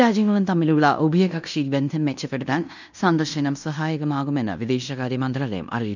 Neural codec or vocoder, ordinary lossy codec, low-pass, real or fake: codec, 16 kHz in and 24 kHz out, 0.9 kbps, LongCat-Audio-Codec, fine tuned four codebook decoder; none; 7.2 kHz; fake